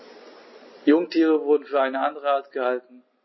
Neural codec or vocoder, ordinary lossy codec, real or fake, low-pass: none; MP3, 24 kbps; real; 7.2 kHz